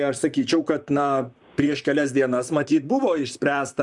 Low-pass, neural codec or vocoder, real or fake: 10.8 kHz; vocoder, 44.1 kHz, 128 mel bands, Pupu-Vocoder; fake